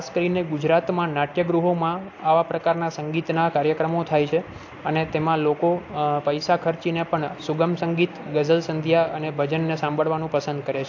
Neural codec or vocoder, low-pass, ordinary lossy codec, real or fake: none; 7.2 kHz; AAC, 48 kbps; real